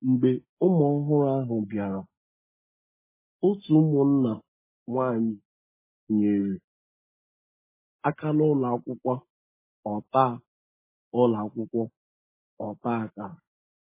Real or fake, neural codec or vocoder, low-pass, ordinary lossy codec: real; none; 3.6 kHz; MP3, 16 kbps